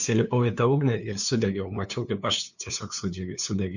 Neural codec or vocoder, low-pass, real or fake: codec, 16 kHz, 2 kbps, FunCodec, trained on LibriTTS, 25 frames a second; 7.2 kHz; fake